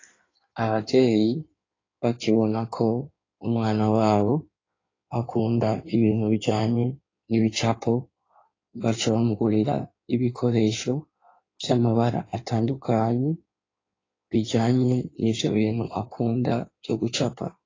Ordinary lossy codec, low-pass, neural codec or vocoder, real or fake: AAC, 32 kbps; 7.2 kHz; codec, 16 kHz in and 24 kHz out, 1.1 kbps, FireRedTTS-2 codec; fake